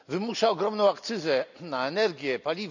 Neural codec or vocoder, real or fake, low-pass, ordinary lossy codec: none; real; 7.2 kHz; none